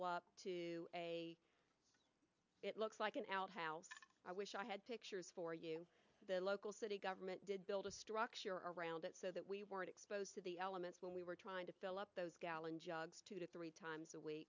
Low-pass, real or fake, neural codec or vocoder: 7.2 kHz; real; none